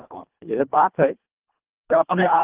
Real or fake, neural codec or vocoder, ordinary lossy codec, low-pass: fake; codec, 24 kHz, 1.5 kbps, HILCodec; Opus, 16 kbps; 3.6 kHz